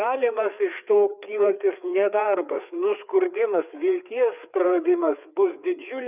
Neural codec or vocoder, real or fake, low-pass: codec, 16 kHz, 4 kbps, FreqCodec, larger model; fake; 3.6 kHz